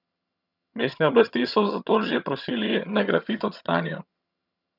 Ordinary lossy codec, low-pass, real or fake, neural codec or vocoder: none; 5.4 kHz; fake; vocoder, 22.05 kHz, 80 mel bands, HiFi-GAN